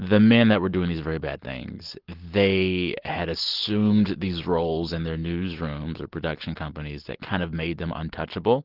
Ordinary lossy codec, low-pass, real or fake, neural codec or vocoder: Opus, 16 kbps; 5.4 kHz; real; none